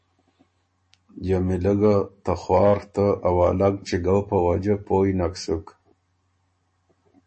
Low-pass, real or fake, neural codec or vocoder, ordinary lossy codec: 10.8 kHz; real; none; MP3, 32 kbps